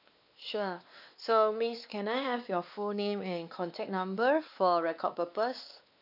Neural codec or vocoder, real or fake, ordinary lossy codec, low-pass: codec, 16 kHz, 2 kbps, X-Codec, WavLM features, trained on Multilingual LibriSpeech; fake; none; 5.4 kHz